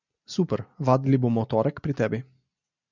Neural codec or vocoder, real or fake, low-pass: none; real; 7.2 kHz